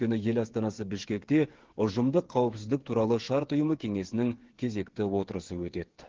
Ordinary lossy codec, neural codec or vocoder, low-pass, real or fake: Opus, 16 kbps; codec, 16 kHz, 8 kbps, FreqCodec, smaller model; 7.2 kHz; fake